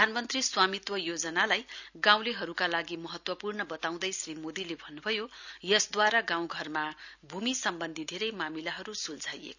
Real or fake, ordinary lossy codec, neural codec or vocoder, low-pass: real; none; none; 7.2 kHz